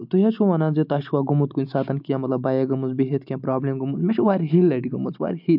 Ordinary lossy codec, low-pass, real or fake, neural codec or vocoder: AAC, 48 kbps; 5.4 kHz; real; none